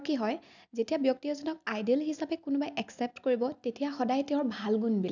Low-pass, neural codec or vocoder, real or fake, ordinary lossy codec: 7.2 kHz; none; real; none